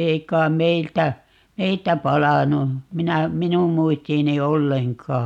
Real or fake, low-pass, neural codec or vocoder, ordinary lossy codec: real; 19.8 kHz; none; none